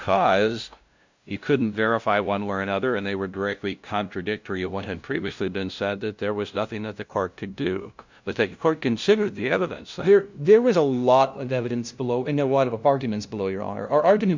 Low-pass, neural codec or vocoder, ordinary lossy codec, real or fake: 7.2 kHz; codec, 16 kHz, 0.5 kbps, FunCodec, trained on LibriTTS, 25 frames a second; MP3, 64 kbps; fake